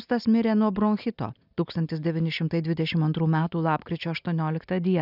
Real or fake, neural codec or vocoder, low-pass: real; none; 5.4 kHz